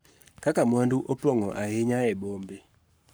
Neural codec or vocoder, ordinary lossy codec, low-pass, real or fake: codec, 44.1 kHz, 7.8 kbps, Pupu-Codec; none; none; fake